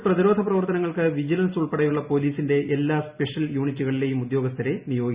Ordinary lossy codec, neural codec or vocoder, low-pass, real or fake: Opus, 64 kbps; none; 3.6 kHz; real